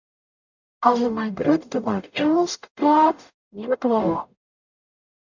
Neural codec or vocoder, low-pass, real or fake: codec, 44.1 kHz, 0.9 kbps, DAC; 7.2 kHz; fake